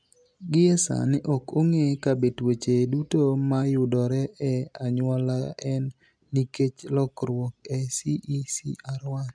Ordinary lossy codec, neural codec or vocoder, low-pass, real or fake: MP3, 96 kbps; none; 9.9 kHz; real